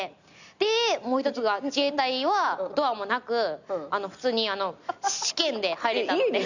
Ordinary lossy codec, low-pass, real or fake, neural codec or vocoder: none; 7.2 kHz; real; none